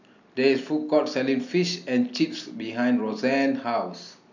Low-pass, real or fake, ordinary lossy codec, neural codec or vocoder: 7.2 kHz; real; none; none